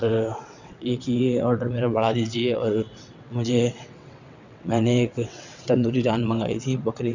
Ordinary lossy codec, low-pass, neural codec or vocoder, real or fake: none; 7.2 kHz; vocoder, 22.05 kHz, 80 mel bands, WaveNeXt; fake